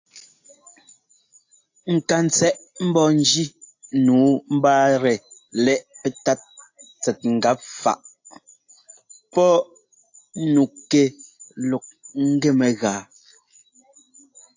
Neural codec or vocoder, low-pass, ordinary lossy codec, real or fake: none; 7.2 kHz; AAC, 48 kbps; real